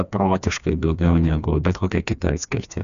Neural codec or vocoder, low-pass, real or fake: codec, 16 kHz, 2 kbps, FreqCodec, smaller model; 7.2 kHz; fake